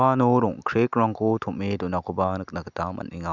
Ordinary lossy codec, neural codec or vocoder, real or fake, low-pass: none; none; real; 7.2 kHz